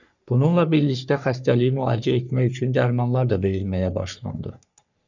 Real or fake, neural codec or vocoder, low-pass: fake; codec, 44.1 kHz, 3.4 kbps, Pupu-Codec; 7.2 kHz